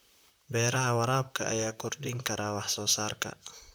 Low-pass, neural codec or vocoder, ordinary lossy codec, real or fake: none; vocoder, 44.1 kHz, 128 mel bands, Pupu-Vocoder; none; fake